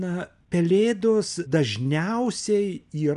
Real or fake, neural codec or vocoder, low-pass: real; none; 10.8 kHz